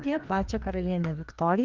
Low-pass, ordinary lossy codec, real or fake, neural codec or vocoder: 7.2 kHz; Opus, 24 kbps; fake; codec, 16 kHz, 2 kbps, FreqCodec, larger model